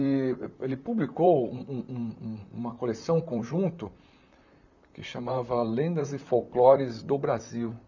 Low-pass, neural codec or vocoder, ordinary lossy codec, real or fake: 7.2 kHz; vocoder, 44.1 kHz, 128 mel bands, Pupu-Vocoder; none; fake